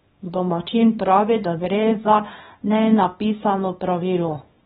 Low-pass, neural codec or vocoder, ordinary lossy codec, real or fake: 10.8 kHz; codec, 24 kHz, 0.9 kbps, WavTokenizer, small release; AAC, 16 kbps; fake